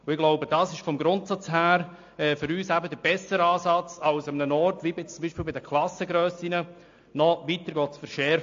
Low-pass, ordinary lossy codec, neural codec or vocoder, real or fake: 7.2 kHz; AAC, 48 kbps; none; real